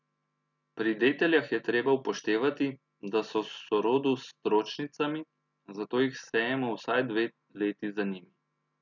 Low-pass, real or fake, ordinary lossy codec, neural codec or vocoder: 7.2 kHz; real; none; none